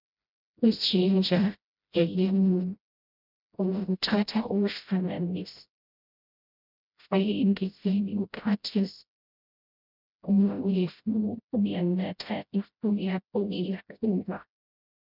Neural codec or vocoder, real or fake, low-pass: codec, 16 kHz, 0.5 kbps, FreqCodec, smaller model; fake; 5.4 kHz